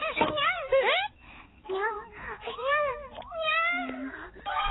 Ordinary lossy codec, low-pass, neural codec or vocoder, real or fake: AAC, 16 kbps; 7.2 kHz; codec, 16 kHz, 4 kbps, X-Codec, HuBERT features, trained on balanced general audio; fake